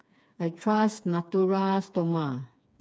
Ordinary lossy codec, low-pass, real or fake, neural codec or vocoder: none; none; fake; codec, 16 kHz, 4 kbps, FreqCodec, smaller model